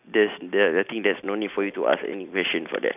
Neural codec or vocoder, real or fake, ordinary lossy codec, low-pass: none; real; none; 3.6 kHz